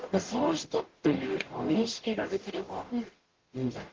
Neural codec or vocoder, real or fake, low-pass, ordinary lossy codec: codec, 44.1 kHz, 0.9 kbps, DAC; fake; 7.2 kHz; Opus, 16 kbps